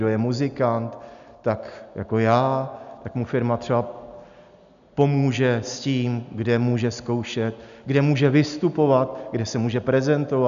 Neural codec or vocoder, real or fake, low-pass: none; real; 7.2 kHz